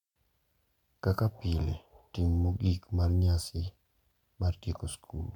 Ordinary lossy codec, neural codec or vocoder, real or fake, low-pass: MP3, 96 kbps; none; real; 19.8 kHz